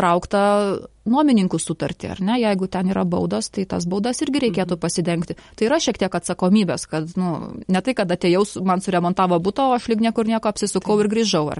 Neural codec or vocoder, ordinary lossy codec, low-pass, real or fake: none; MP3, 48 kbps; 10.8 kHz; real